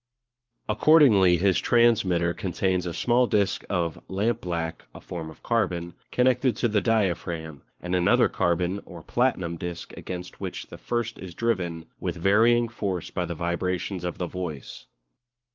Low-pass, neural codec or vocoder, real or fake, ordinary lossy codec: 7.2 kHz; codec, 44.1 kHz, 7.8 kbps, Pupu-Codec; fake; Opus, 32 kbps